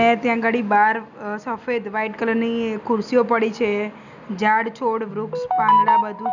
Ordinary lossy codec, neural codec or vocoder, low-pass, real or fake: none; none; 7.2 kHz; real